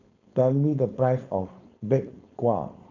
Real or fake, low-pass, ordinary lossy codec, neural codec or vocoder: fake; 7.2 kHz; none; codec, 16 kHz, 4.8 kbps, FACodec